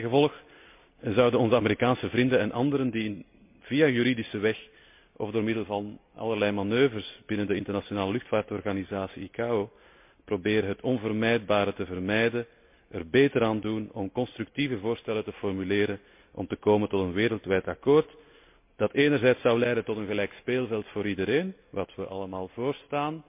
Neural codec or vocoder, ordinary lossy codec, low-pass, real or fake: none; MP3, 32 kbps; 3.6 kHz; real